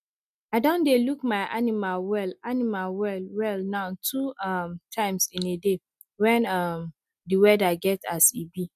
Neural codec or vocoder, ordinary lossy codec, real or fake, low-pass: none; none; real; 14.4 kHz